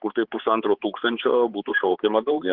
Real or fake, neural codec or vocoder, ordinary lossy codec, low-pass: fake; codec, 16 kHz, 4 kbps, X-Codec, HuBERT features, trained on balanced general audio; Opus, 16 kbps; 5.4 kHz